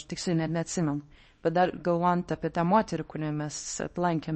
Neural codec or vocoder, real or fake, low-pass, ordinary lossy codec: codec, 24 kHz, 0.9 kbps, WavTokenizer, small release; fake; 10.8 kHz; MP3, 32 kbps